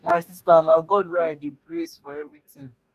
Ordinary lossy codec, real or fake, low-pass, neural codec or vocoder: none; fake; 14.4 kHz; codec, 44.1 kHz, 2.6 kbps, DAC